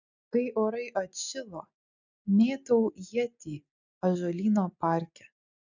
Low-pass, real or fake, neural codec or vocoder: 7.2 kHz; real; none